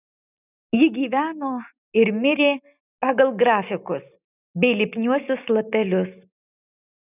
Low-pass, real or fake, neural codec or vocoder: 3.6 kHz; real; none